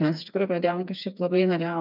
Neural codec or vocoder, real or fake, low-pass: codec, 16 kHz, 4 kbps, FreqCodec, smaller model; fake; 5.4 kHz